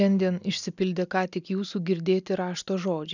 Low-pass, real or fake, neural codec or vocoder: 7.2 kHz; real; none